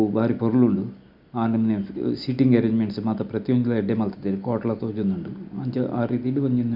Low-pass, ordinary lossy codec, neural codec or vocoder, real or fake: 5.4 kHz; none; none; real